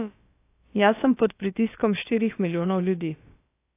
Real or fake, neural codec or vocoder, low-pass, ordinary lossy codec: fake; codec, 16 kHz, about 1 kbps, DyCAST, with the encoder's durations; 3.6 kHz; AAC, 24 kbps